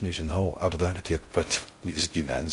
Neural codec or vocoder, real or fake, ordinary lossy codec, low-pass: codec, 16 kHz in and 24 kHz out, 0.6 kbps, FocalCodec, streaming, 4096 codes; fake; MP3, 48 kbps; 10.8 kHz